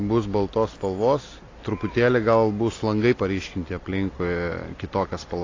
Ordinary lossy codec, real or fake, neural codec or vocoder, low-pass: AAC, 32 kbps; real; none; 7.2 kHz